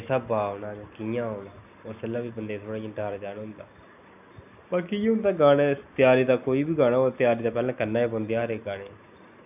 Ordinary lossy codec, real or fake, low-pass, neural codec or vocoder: none; real; 3.6 kHz; none